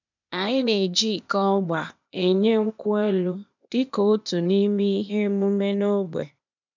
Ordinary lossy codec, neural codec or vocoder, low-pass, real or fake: none; codec, 16 kHz, 0.8 kbps, ZipCodec; 7.2 kHz; fake